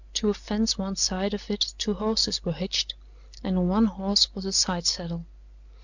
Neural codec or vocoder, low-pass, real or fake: none; 7.2 kHz; real